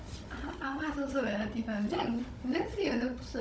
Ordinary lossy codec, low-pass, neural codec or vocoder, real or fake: none; none; codec, 16 kHz, 16 kbps, FunCodec, trained on Chinese and English, 50 frames a second; fake